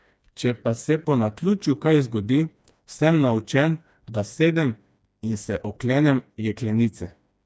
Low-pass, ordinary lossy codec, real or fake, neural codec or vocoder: none; none; fake; codec, 16 kHz, 2 kbps, FreqCodec, smaller model